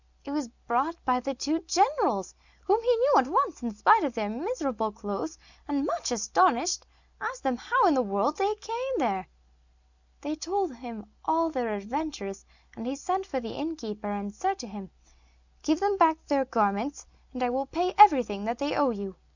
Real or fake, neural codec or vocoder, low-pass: real; none; 7.2 kHz